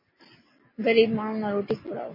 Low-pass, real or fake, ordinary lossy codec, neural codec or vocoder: 5.4 kHz; real; MP3, 24 kbps; none